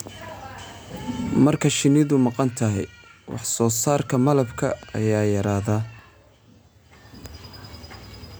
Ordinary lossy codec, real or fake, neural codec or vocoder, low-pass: none; real; none; none